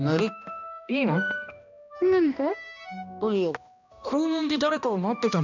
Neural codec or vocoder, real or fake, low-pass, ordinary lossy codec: codec, 16 kHz, 1 kbps, X-Codec, HuBERT features, trained on balanced general audio; fake; 7.2 kHz; none